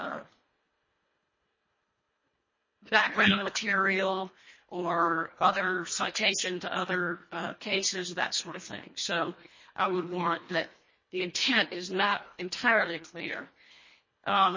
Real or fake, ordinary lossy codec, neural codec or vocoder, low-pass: fake; MP3, 32 kbps; codec, 24 kHz, 1.5 kbps, HILCodec; 7.2 kHz